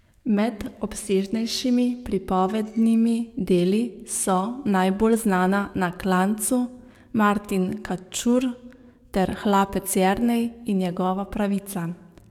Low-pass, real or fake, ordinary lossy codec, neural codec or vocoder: 19.8 kHz; fake; none; codec, 44.1 kHz, 7.8 kbps, DAC